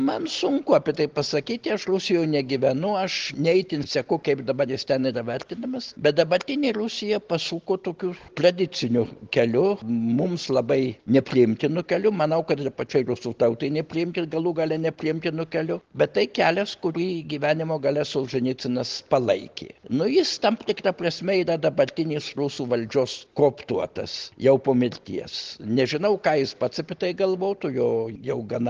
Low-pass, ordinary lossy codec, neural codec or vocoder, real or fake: 7.2 kHz; Opus, 16 kbps; none; real